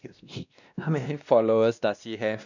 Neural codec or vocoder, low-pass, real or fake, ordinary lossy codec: codec, 16 kHz, 1 kbps, X-Codec, WavLM features, trained on Multilingual LibriSpeech; 7.2 kHz; fake; none